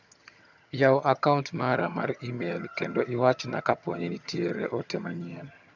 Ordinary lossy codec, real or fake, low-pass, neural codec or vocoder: none; fake; 7.2 kHz; vocoder, 22.05 kHz, 80 mel bands, HiFi-GAN